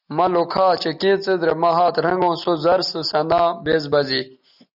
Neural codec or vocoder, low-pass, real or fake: none; 5.4 kHz; real